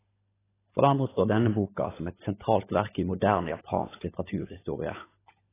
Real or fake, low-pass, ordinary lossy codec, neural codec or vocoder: fake; 3.6 kHz; AAC, 16 kbps; codec, 16 kHz, 16 kbps, FunCodec, trained on LibriTTS, 50 frames a second